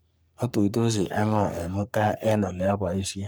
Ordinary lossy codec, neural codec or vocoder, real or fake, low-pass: none; codec, 44.1 kHz, 3.4 kbps, Pupu-Codec; fake; none